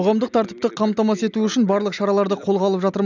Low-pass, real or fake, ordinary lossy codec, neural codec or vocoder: 7.2 kHz; real; none; none